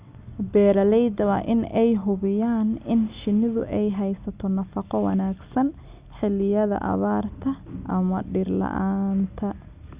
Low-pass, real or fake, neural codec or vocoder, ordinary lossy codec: 3.6 kHz; real; none; Opus, 64 kbps